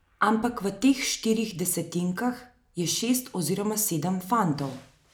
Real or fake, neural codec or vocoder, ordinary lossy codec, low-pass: real; none; none; none